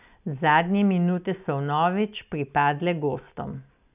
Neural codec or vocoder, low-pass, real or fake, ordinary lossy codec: none; 3.6 kHz; real; none